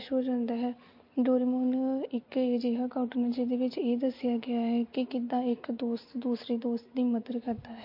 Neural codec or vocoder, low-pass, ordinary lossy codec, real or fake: none; 5.4 kHz; MP3, 32 kbps; real